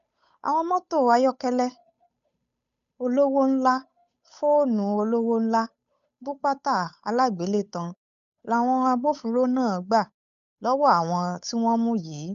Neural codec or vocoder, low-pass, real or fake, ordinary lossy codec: codec, 16 kHz, 8 kbps, FunCodec, trained on Chinese and English, 25 frames a second; 7.2 kHz; fake; none